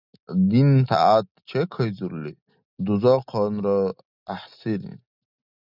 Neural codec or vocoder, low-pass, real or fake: none; 5.4 kHz; real